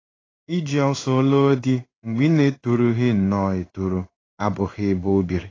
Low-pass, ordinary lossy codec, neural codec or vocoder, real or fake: 7.2 kHz; AAC, 32 kbps; codec, 16 kHz in and 24 kHz out, 1 kbps, XY-Tokenizer; fake